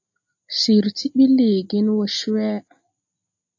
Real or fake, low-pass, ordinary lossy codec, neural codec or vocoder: real; 7.2 kHz; AAC, 48 kbps; none